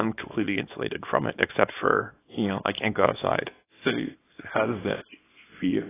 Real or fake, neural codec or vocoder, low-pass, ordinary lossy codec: fake; codec, 24 kHz, 0.9 kbps, WavTokenizer, small release; 3.6 kHz; AAC, 16 kbps